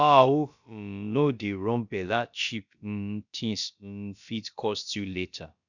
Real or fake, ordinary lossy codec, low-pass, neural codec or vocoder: fake; none; 7.2 kHz; codec, 16 kHz, about 1 kbps, DyCAST, with the encoder's durations